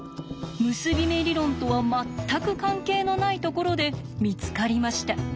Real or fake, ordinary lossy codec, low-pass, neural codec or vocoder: real; none; none; none